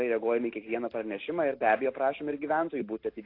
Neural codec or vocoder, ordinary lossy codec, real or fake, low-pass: none; AAC, 32 kbps; real; 5.4 kHz